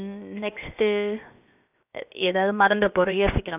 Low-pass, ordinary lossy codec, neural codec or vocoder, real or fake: 3.6 kHz; none; codec, 16 kHz, 0.7 kbps, FocalCodec; fake